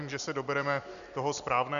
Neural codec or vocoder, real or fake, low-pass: none; real; 7.2 kHz